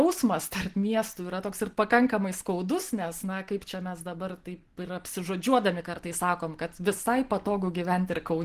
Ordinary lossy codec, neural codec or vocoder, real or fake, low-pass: Opus, 16 kbps; none; real; 14.4 kHz